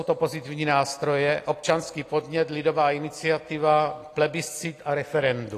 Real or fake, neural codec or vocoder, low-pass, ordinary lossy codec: real; none; 14.4 kHz; AAC, 48 kbps